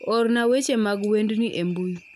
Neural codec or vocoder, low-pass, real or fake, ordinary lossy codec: none; 14.4 kHz; real; none